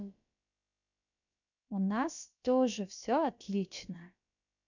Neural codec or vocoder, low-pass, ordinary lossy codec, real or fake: codec, 16 kHz, about 1 kbps, DyCAST, with the encoder's durations; 7.2 kHz; none; fake